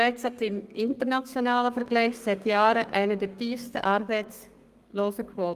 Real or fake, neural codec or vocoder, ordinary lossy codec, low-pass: fake; codec, 32 kHz, 1.9 kbps, SNAC; Opus, 32 kbps; 14.4 kHz